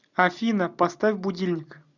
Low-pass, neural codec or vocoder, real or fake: 7.2 kHz; none; real